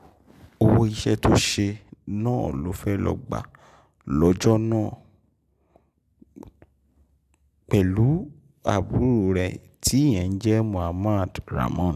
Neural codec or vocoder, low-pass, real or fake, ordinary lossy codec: none; 14.4 kHz; real; none